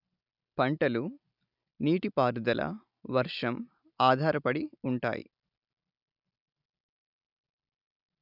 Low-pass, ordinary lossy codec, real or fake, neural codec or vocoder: 5.4 kHz; none; real; none